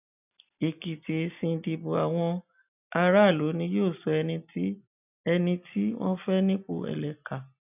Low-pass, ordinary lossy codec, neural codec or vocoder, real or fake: 3.6 kHz; none; none; real